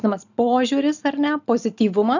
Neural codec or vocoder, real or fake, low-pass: none; real; 7.2 kHz